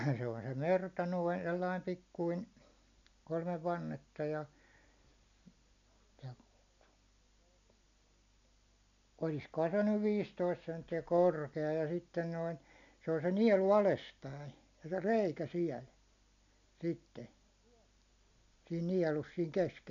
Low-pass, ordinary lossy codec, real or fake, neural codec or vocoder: 7.2 kHz; none; real; none